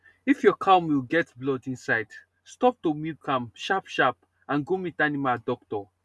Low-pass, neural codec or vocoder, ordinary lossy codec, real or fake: none; none; none; real